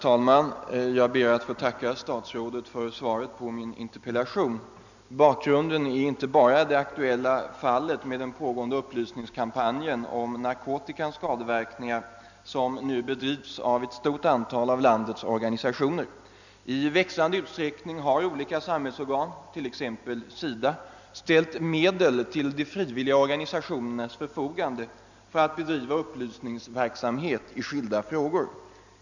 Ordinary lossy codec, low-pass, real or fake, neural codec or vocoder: none; 7.2 kHz; real; none